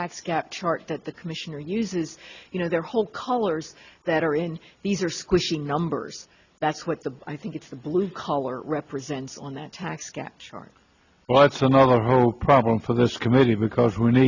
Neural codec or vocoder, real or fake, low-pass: none; real; 7.2 kHz